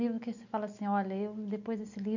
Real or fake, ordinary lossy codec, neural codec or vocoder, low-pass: real; none; none; 7.2 kHz